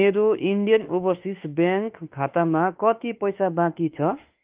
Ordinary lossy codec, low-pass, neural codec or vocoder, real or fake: Opus, 32 kbps; 3.6 kHz; autoencoder, 48 kHz, 32 numbers a frame, DAC-VAE, trained on Japanese speech; fake